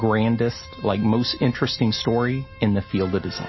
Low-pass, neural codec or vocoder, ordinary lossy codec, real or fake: 7.2 kHz; none; MP3, 24 kbps; real